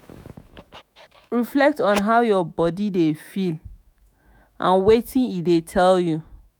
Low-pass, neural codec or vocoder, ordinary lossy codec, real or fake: none; autoencoder, 48 kHz, 128 numbers a frame, DAC-VAE, trained on Japanese speech; none; fake